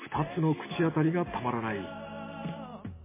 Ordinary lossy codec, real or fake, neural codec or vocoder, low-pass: MP3, 16 kbps; real; none; 3.6 kHz